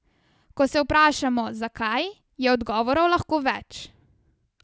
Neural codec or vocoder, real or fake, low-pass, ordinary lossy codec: none; real; none; none